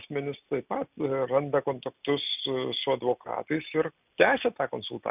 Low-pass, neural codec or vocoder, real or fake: 3.6 kHz; none; real